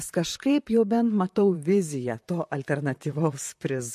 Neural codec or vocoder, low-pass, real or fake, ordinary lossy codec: vocoder, 44.1 kHz, 128 mel bands, Pupu-Vocoder; 14.4 kHz; fake; MP3, 64 kbps